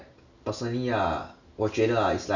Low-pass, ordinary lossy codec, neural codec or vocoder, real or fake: 7.2 kHz; none; none; real